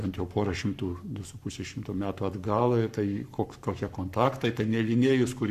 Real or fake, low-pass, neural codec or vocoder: fake; 14.4 kHz; codec, 44.1 kHz, 7.8 kbps, Pupu-Codec